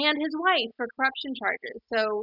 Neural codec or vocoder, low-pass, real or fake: none; 5.4 kHz; real